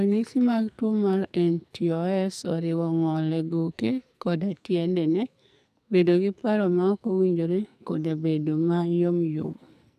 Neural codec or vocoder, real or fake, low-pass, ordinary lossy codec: codec, 44.1 kHz, 2.6 kbps, SNAC; fake; 14.4 kHz; none